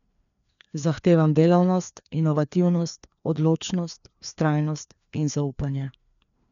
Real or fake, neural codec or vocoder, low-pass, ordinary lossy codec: fake; codec, 16 kHz, 2 kbps, FreqCodec, larger model; 7.2 kHz; none